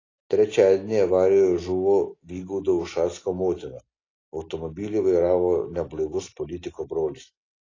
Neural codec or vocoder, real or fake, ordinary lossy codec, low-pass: none; real; AAC, 32 kbps; 7.2 kHz